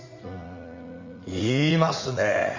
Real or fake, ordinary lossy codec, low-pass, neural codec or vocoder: fake; Opus, 64 kbps; 7.2 kHz; vocoder, 44.1 kHz, 80 mel bands, Vocos